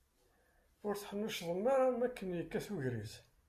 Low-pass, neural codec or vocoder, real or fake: 14.4 kHz; none; real